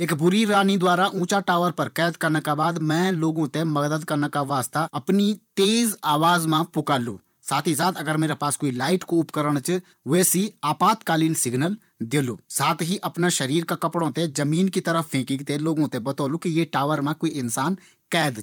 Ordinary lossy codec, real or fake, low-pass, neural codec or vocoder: none; fake; 19.8 kHz; vocoder, 44.1 kHz, 128 mel bands, Pupu-Vocoder